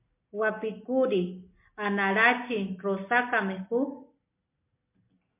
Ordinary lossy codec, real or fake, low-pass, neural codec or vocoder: MP3, 24 kbps; real; 3.6 kHz; none